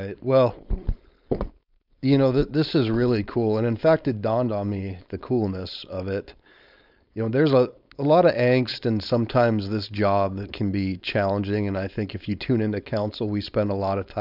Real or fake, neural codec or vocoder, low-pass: fake; codec, 16 kHz, 4.8 kbps, FACodec; 5.4 kHz